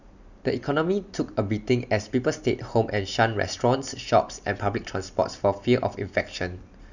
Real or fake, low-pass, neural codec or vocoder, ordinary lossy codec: real; 7.2 kHz; none; none